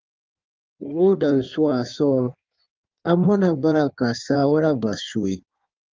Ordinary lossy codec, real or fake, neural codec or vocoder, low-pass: Opus, 24 kbps; fake; codec, 16 kHz in and 24 kHz out, 1.1 kbps, FireRedTTS-2 codec; 7.2 kHz